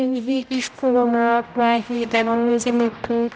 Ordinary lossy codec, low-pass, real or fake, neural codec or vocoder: none; none; fake; codec, 16 kHz, 0.5 kbps, X-Codec, HuBERT features, trained on general audio